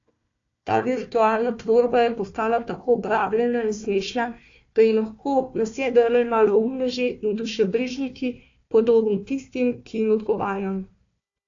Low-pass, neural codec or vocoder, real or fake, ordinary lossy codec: 7.2 kHz; codec, 16 kHz, 1 kbps, FunCodec, trained on Chinese and English, 50 frames a second; fake; AAC, 48 kbps